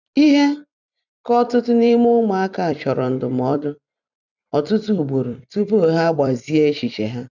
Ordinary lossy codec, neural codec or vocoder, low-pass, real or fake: none; vocoder, 44.1 kHz, 128 mel bands every 256 samples, BigVGAN v2; 7.2 kHz; fake